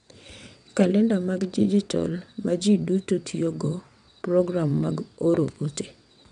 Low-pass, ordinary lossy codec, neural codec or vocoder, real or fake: 9.9 kHz; none; vocoder, 22.05 kHz, 80 mel bands, WaveNeXt; fake